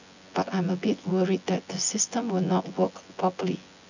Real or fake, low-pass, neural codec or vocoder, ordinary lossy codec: fake; 7.2 kHz; vocoder, 24 kHz, 100 mel bands, Vocos; none